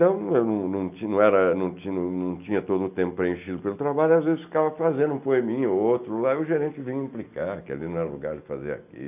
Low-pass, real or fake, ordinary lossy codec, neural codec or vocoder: 3.6 kHz; real; none; none